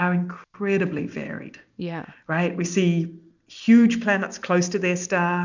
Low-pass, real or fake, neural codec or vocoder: 7.2 kHz; real; none